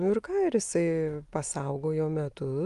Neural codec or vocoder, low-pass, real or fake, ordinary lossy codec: none; 10.8 kHz; real; Opus, 32 kbps